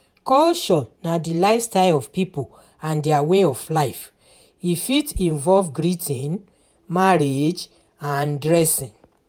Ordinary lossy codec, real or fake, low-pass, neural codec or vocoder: none; fake; 19.8 kHz; vocoder, 48 kHz, 128 mel bands, Vocos